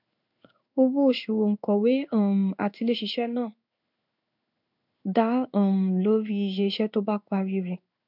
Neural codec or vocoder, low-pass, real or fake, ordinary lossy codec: codec, 16 kHz in and 24 kHz out, 1 kbps, XY-Tokenizer; 5.4 kHz; fake; none